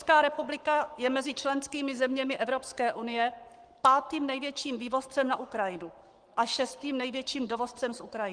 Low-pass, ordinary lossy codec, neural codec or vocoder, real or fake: 9.9 kHz; Opus, 24 kbps; codec, 44.1 kHz, 7.8 kbps, Pupu-Codec; fake